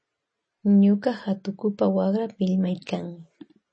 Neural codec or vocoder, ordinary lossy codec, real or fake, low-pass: none; MP3, 32 kbps; real; 9.9 kHz